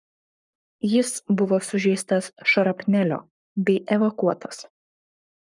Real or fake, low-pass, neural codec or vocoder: fake; 10.8 kHz; codec, 44.1 kHz, 7.8 kbps, Pupu-Codec